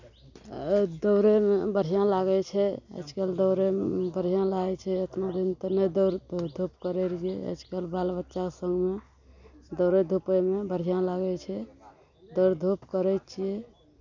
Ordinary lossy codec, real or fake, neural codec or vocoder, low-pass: none; real; none; 7.2 kHz